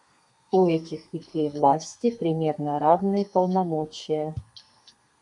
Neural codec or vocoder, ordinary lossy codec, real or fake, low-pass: codec, 32 kHz, 1.9 kbps, SNAC; MP3, 96 kbps; fake; 10.8 kHz